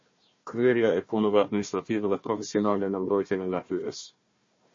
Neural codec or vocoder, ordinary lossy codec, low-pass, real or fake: codec, 16 kHz, 1 kbps, FunCodec, trained on Chinese and English, 50 frames a second; MP3, 32 kbps; 7.2 kHz; fake